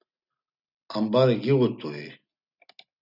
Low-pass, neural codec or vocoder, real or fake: 5.4 kHz; none; real